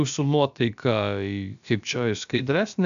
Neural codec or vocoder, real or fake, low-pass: codec, 16 kHz, about 1 kbps, DyCAST, with the encoder's durations; fake; 7.2 kHz